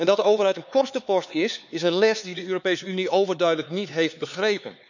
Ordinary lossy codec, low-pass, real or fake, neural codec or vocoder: none; 7.2 kHz; fake; codec, 16 kHz, 4 kbps, X-Codec, HuBERT features, trained on LibriSpeech